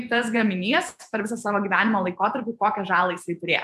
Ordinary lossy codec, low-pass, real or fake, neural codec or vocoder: AAC, 96 kbps; 14.4 kHz; fake; vocoder, 48 kHz, 128 mel bands, Vocos